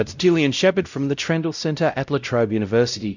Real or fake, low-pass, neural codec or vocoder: fake; 7.2 kHz; codec, 16 kHz, 0.5 kbps, X-Codec, WavLM features, trained on Multilingual LibriSpeech